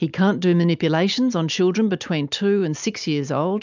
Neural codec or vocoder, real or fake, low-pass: none; real; 7.2 kHz